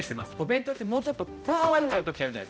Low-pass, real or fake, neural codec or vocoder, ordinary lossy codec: none; fake; codec, 16 kHz, 0.5 kbps, X-Codec, HuBERT features, trained on balanced general audio; none